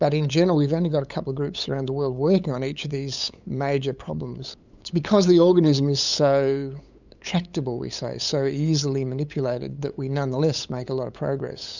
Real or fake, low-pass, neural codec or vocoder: fake; 7.2 kHz; codec, 16 kHz, 8 kbps, FunCodec, trained on LibriTTS, 25 frames a second